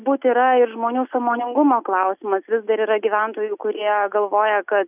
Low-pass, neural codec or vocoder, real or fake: 3.6 kHz; none; real